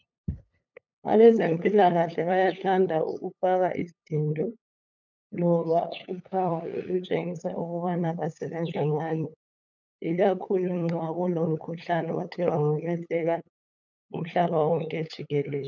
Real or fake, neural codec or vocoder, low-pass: fake; codec, 16 kHz, 8 kbps, FunCodec, trained on LibriTTS, 25 frames a second; 7.2 kHz